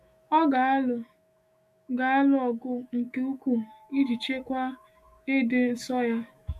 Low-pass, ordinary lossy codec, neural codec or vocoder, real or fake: 14.4 kHz; MP3, 64 kbps; autoencoder, 48 kHz, 128 numbers a frame, DAC-VAE, trained on Japanese speech; fake